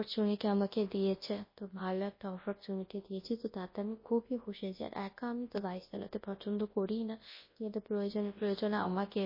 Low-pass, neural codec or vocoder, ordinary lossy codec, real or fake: 5.4 kHz; codec, 24 kHz, 0.9 kbps, WavTokenizer, large speech release; MP3, 24 kbps; fake